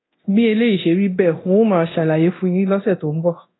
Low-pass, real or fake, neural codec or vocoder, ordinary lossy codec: 7.2 kHz; fake; codec, 24 kHz, 0.9 kbps, DualCodec; AAC, 16 kbps